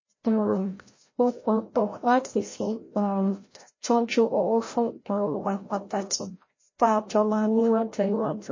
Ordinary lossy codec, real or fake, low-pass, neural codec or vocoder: MP3, 32 kbps; fake; 7.2 kHz; codec, 16 kHz, 0.5 kbps, FreqCodec, larger model